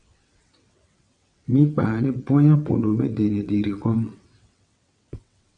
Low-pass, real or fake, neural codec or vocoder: 9.9 kHz; fake; vocoder, 22.05 kHz, 80 mel bands, WaveNeXt